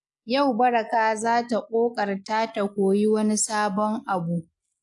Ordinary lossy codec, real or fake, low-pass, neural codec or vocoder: AAC, 64 kbps; real; 10.8 kHz; none